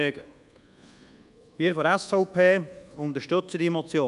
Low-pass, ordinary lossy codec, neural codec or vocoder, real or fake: 10.8 kHz; none; codec, 24 kHz, 1.2 kbps, DualCodec; fake